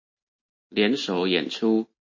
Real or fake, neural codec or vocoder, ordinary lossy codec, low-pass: real; none; MP3, 32 kbps; 7.2 kHz